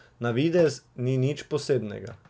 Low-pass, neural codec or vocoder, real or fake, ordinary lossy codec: none; none; real; none